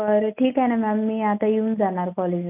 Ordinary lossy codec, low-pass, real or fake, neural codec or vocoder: none; 3.6 kHz; real; none